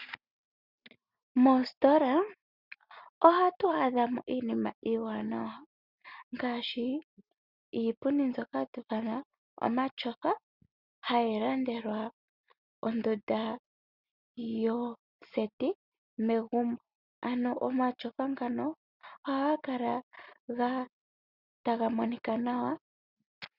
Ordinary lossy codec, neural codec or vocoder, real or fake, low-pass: Opus, 64 kbps; none; real; 5.4 kHz